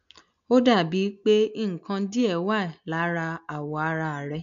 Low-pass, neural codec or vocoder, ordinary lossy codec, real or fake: 7.2 kHz; none; none; real